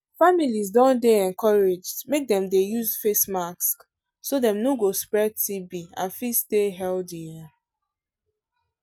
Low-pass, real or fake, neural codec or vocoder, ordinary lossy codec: 19.8 kHz; real; none; none